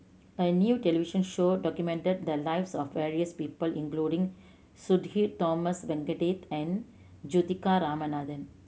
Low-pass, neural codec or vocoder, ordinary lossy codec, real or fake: none; none; none; real